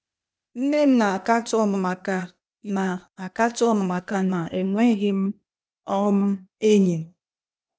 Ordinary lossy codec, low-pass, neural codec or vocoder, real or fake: none; none; codec, 16 kHz, 0.8 kbps, ZipCodec; fake